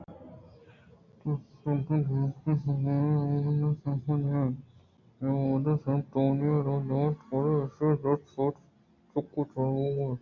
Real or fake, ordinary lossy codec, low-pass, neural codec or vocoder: real; AAC, 48 kbps; 7.2 kHz; none